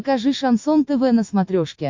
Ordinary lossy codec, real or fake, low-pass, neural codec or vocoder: MP3, 48 kbps; real; 7.2 kHz; none